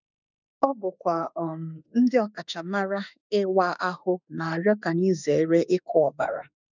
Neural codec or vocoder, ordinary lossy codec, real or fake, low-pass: autoencoder, 48 kHz, 32 numbers a frame, DAC-VAE, trained on Japanese speech; none; fake; 7.2 kHz